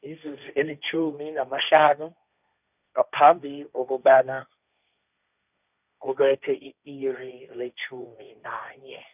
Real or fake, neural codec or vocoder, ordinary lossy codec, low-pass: fake; codec, 16 kHz, 1.1 kbps, Voila-Tokenizer; none; 3.6 kHz